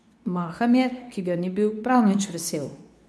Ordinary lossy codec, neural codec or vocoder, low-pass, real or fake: none; codec, 24 kHz, 0.9 kbps, WavTokenizer, medium speech release version 2; none; fake